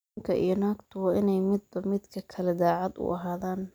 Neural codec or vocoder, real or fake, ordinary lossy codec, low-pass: none; real; none; none